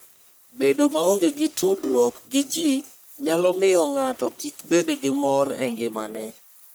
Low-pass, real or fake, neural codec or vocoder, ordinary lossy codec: none; fake; codec, 44.1 kHz, 1.7 kbps, Pupu-Codec; none